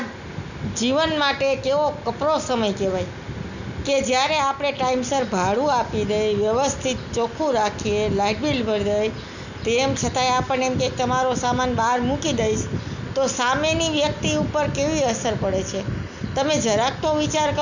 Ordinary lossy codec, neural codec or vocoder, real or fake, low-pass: none; none; real; 7.2 kHz